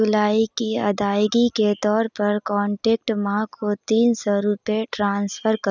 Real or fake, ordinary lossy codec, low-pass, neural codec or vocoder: real; none; 7.2 kHz; none